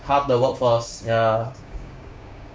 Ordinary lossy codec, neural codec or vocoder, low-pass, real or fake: none; codec, 16 kHz, 6 kbps, DAC; none; fake